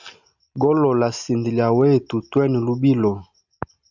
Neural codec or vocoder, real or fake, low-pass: none; real; 7.2 kHz